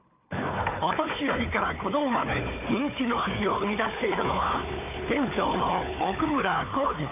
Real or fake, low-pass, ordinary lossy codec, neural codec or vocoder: fake; 3.6 kHz; none; codec, 16 kHz, 4 kbps, FunCodec, trained on Chinese and English, 50 frames a second